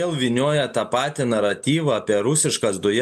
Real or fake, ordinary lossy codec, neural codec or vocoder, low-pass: real; MP3, 96 kbps; none; 14.4 kHz